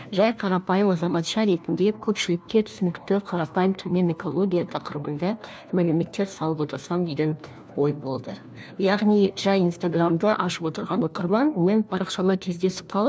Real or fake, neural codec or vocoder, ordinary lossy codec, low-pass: fake; codec, 16 kHz, 1 kbps, FunCodec, trained on LibriTTS, 50 frames a second; none; none